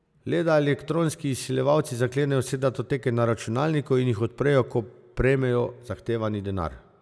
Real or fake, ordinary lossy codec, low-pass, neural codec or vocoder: real; none; none; none